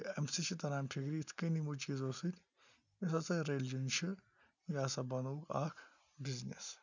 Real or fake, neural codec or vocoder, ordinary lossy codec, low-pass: real; none; none; 7.2 kHz